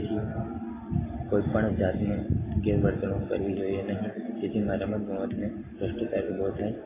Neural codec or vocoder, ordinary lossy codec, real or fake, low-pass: none; AAC, 16 kbps; real; 3.6 kHz